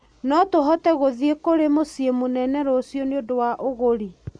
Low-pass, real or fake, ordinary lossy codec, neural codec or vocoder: 9.9 kHz; real; MP3, 64 kbps; none